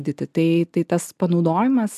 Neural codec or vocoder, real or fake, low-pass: none; real; 14.4 kHz